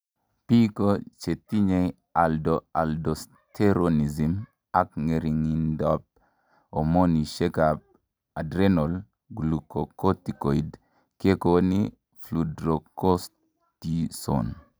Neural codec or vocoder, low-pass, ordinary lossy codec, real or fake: none; none; none; real